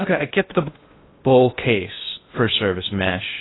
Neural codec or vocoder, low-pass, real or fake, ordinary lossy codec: codec, 16 kHz in and 24 kHz out, 0.6 kbps, FocalCodec, streaming, 2048 codes; 7.2 kHz; fake; AAC, 16 kbps